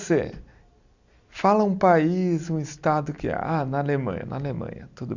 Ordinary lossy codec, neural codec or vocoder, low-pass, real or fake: Opus, 64 kbps; none; 7.2 kHz; real